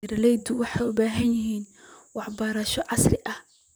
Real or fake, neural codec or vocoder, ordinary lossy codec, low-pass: fake; vocoder, 44.1 kHz, 128 mel bands, Pupu-Vocoder; none; none